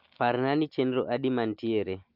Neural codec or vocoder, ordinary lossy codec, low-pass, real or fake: none; Opus, 24 kbps; 5.4 kHz; real